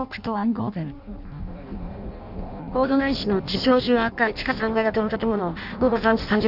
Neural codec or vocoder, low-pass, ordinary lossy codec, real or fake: codec, 16 kHz in and 24 kHz out, 0.6 kbps, FireRedTTS-2 codec; 5.4 kHz; none; fake